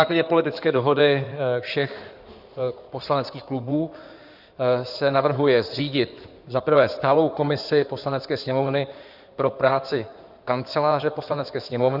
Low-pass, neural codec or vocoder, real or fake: 5.4 kHz; codec, 16 kHz in and 24 kHz out, 2.2 kbps, FireRedTTS-2 codec; fake